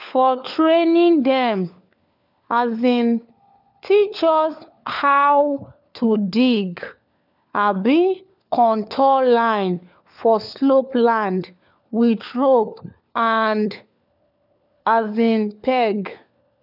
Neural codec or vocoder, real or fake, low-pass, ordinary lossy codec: codec, 16 kHz, 4 kbps, FunCodec, trained on LibriTTS, 50 frames a second; fake; 5.4 kHz; none